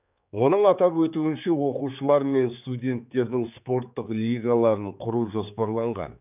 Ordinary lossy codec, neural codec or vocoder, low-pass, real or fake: none; codec, 16 kHz, 4 kbps, X-Codec, HuBERT features, trained on general audio; 3.6 kHz; fake